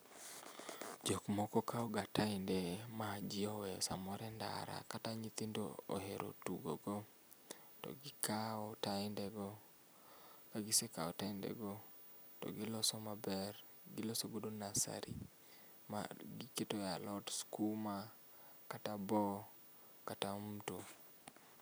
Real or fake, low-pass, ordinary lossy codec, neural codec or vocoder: real; none; none; none